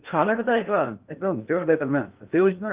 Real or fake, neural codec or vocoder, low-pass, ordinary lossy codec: fake; codec, 16 kHz in and 24 kHz out, 0.6 kbps, FocalCodec, streaming, 2048 codes; 3.6 kHz; Opus, 32 kbps